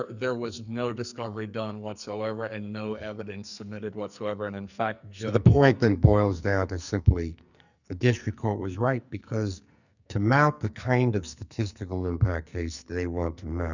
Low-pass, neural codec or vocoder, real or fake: 7.2 kHz; codec, 44.1 kHz, 2.6 kbps, SNAC; fake